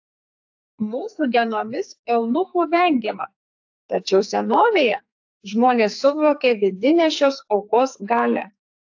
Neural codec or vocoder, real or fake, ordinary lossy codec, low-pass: codec, 44.1 kHz, 2.6 kbps, SNAC; fake; AAC, 48 kbps; 7.2 kHz